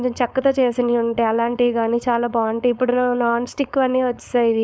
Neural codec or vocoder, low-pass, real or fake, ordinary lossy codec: codec, 16 kHz, 4.8 kbps, FACodec; none; fake; none